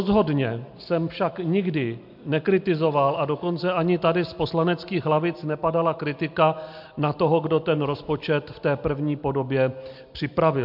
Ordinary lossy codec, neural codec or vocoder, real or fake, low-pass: MP3, 48 kbps; none; real; 5.4 kHz